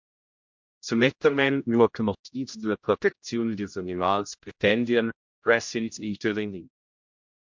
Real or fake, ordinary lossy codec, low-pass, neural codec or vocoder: fake; MP3, 64 kbps; 7.2 kHz; codec, 16 kHz, 0.5 kbps, X-Codec, HuBERT features, trained on general audio